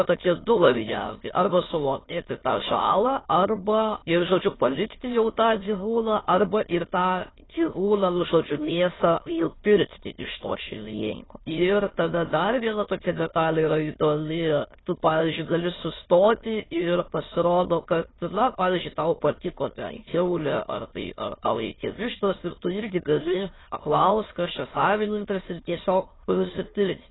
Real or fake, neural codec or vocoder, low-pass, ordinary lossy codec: fake; autoencoder, 22.05 kHz, a latent of 192 numbers a frame, VITS, trained on many speakers; 7.2 kHz; AAC, 16 kbps